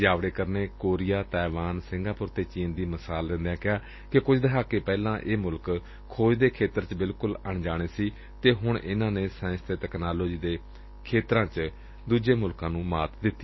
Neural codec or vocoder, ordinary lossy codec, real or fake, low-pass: none; MP3, 24 kbps; real; 7.2 kHz